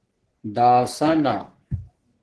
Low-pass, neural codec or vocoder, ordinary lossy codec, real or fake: 10.8 kHz; codec, 44.1 kHz, 3.4 kbps, Pupu-Codec; Opus, 16 kbps; fake